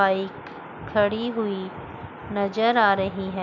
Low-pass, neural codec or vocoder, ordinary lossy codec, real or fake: 7.2 kHz; none; none; real